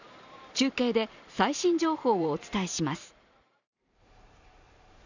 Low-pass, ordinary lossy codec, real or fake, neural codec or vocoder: 7.2 kHz; none; fake; vocoder, 44.1 kHz, 128 mel bands every 512 samples, BigVGAN v2